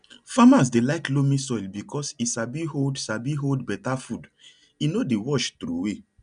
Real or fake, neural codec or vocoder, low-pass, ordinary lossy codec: real; none; 9.9 kHz; none